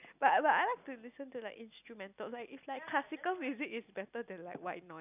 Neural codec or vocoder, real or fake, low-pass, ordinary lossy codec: none; real; 3.6 kHz; none